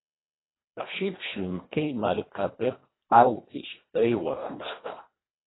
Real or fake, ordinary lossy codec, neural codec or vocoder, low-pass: fake; AAC, 16 kbps; codec, 24 kHz, 1.5 kbps, HILCodec; 7.2 kHz